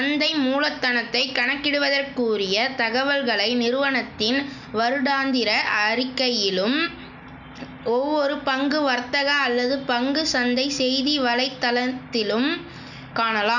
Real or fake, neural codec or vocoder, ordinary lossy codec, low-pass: real; none; none; 7.2 kHz